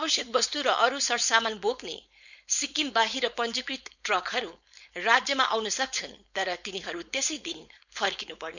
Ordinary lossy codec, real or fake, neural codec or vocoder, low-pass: none; fake; codec, 16 kHz, 4.8 kbps, FACodec; 7.2 kHz